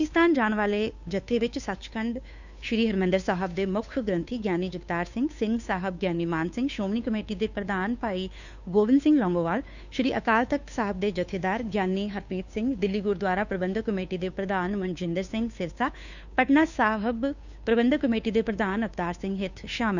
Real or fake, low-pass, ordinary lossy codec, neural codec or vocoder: fake; 7.2 kHz; none; codec, 16 kHz, 2 kbps, FunCodec, trained on Chinese and English, 25 frames a second